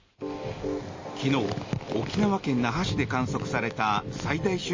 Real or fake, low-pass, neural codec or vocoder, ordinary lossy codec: real; 7.2 kHz; none; MP3, 32 kbps